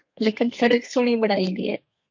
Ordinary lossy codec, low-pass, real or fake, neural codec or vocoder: MP3, 48 kbps; 7.2 kHz; fake; codec, 32 kHz, 1.9 kbps, SNAC